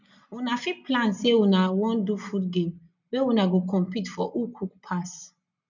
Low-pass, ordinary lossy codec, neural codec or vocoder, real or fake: 7.2 kHz; none; none; real